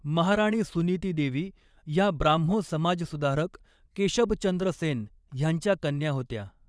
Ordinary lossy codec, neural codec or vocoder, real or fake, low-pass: none; vocoder, 22.05 kHz, 80 mel bands, Vocos; fake; none